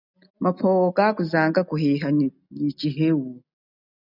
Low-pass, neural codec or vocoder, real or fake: 5.4 kHz; none; real